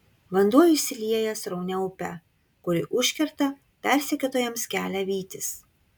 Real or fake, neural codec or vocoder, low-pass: real; none; 19.8 kHz